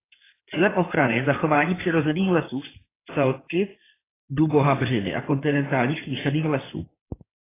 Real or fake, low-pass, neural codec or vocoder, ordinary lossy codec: fake; 3.6 kHz; codec, 16 kHz in and 24 kHz out, 2.2 kbps, FireRedTTS-2 codec; AAC, 16 kbps